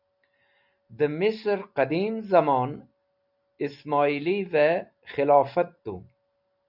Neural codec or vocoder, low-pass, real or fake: none; 5.4 kHz; real